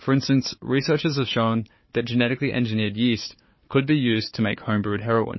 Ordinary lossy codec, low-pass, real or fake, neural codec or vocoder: MP3, 24 kbps; 7.2 kHz; fake; codec, 16 kHz, 4 kbps, FunCodec, trained on Chinese and English, 50 frames a second